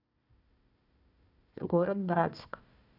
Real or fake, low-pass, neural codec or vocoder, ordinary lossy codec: fake; 5.4 kHz; codec, 16 kHz, 1 kbps, FunCodec, trained on Chinese and English, 50 frames a second; none